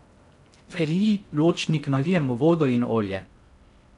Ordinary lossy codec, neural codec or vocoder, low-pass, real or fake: none; codec, 16 kHz in and 24 kHz out, 0.6 kbps, FocalCodec, streaming, 2048 codes; 10.8 kHz; fake